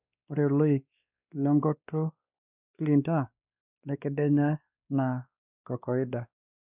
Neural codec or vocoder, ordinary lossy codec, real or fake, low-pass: codec, 16 kHz, 2 kbps, X-Codec, WavLM features, trained on Multilingual LibriSpeech; none; fake; 3.6 kHz